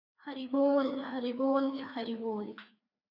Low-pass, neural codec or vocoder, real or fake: 5.4 kHz; codec, 16 kHz, 2 kbps, FreqCodec, larger model; fake